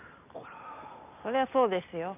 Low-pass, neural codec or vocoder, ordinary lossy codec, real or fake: 3.6 kHz; none; none; real